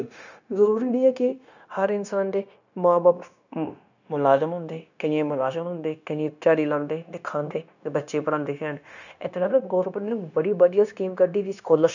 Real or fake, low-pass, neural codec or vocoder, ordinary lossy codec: fake; 7.2 kHz; codec, 16 kHz, 0.9 kbps, LongCat-Audio-Codec; none